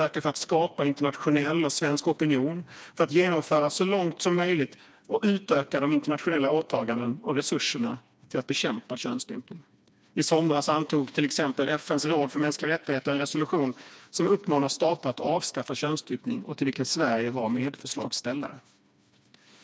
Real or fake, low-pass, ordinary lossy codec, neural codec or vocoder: fake; none; none; codec, 16 kHz, 2 kbps, FreqCodec, smaller model